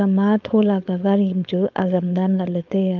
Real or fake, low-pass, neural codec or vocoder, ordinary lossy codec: fake; 7.2 kHz; codec, 16 kHz, 4.8 kbps, FACodec; Opus, 24 kbps